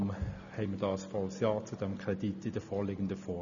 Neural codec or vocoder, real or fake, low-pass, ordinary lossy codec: none; real; 7.2 kHz; none